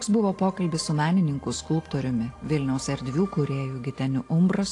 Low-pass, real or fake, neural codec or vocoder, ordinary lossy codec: 10.8 kHz; real; none; AAC, 48 kbps